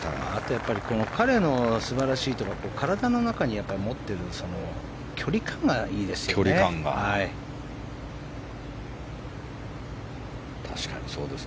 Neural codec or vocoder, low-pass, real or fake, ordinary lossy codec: none; none; real; none